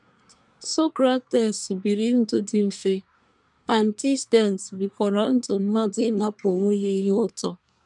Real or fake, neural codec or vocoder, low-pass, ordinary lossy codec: fake; codec, 24 kHz, 1 kbps, SNAC; 10.8 kHz; none